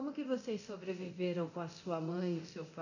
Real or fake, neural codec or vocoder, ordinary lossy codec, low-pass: fake; codec, 24 kHz, 0.9 kbps, DualCodec; none; 7.2 kHz